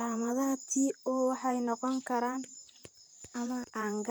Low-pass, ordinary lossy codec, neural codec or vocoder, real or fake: none; none; vocoder, 44.1 kHz, 128 mel bands, Pupu-Vocoder; fake